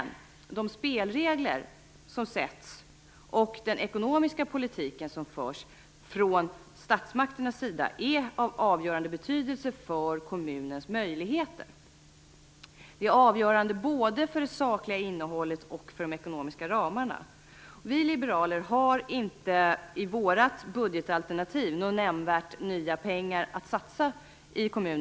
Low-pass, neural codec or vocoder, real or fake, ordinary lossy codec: none; none; real; none